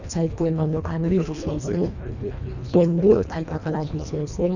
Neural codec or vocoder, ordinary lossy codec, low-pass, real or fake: codec, 24 kHz, 1.5 kbps, HILCodec; none; 7.2 kHz; fake